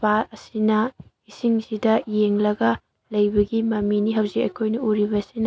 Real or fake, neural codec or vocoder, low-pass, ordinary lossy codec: real; none; none; none